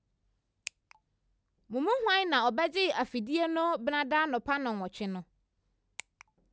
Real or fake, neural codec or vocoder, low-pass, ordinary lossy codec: real; none; none; none